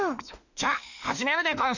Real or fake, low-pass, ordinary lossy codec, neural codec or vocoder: fake; 7.2 kHz; none; autoencoder, 48 kHz, 32 numbers a frame, DAC-VAE, trained on Japanese speech